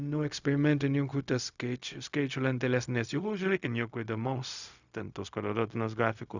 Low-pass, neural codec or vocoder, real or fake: 7.2 kHz; codec, 16 kHz, 0.4 kbps, LongCat-Audio-Codec; fake